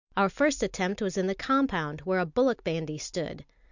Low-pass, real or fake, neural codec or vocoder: 7.2 kHz; real; none